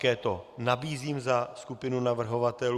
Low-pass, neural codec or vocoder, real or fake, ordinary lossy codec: 14.4 kHz; none; real; Opus, 64 kbps